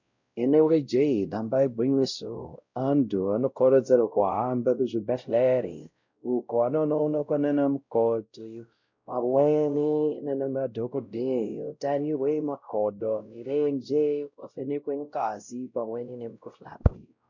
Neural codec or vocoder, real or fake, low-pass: codec, 16 kHz, 0.5 kbps, X-Codec, WavLM features, trained on Multilingual LibriSpeech; fake; 7.2 kHz